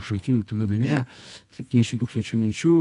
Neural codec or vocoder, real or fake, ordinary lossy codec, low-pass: codec, 24 kHz, 0.9 kbps, WavTokenizer, medium music audio release; fake; AAC, 64 kbps; 10.8 kHz